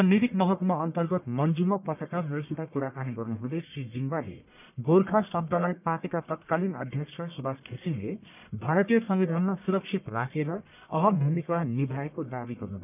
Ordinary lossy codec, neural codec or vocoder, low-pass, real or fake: none; codec, 44.1 kHz, 1.7 kbps, Pupu-Codec; 3.6 kHz; fake